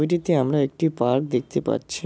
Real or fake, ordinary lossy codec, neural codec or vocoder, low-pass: real; none; none; none